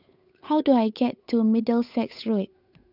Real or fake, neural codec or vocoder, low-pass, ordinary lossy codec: fake; codec, 16 kHz, 8 kbps, FunCodec, trained on Chinese and English, 25 frames a second; 5.4 kHz; none